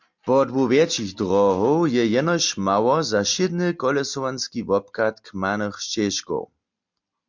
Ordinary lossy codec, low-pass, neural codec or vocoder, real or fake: MP3, 64 kbps; 7.2 kHz; none; real